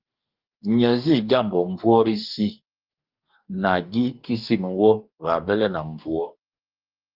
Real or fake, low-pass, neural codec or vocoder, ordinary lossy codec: fake; 5.4 kHz; codec, 44.1 kHz, 2.6 kbps, DAC; Opus, 32 kbps